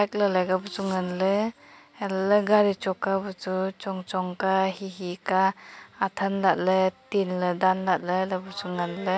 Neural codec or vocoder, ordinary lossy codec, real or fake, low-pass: none; none; real; none